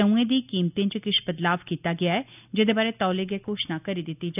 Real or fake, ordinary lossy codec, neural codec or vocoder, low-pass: real; none; none; 3.6 kHz